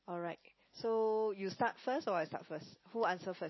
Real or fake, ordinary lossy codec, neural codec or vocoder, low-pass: real; MP3, 24 kbps; none; 7.2 kHz